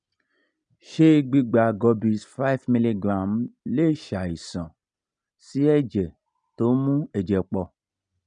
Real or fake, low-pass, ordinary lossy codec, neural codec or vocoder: real; 9.9 kHz; none; none